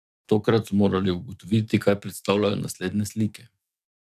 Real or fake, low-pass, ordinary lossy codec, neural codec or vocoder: fake; 14.4 kHz; none; codec, 44.1 kHz, 7.8 kbps, DAC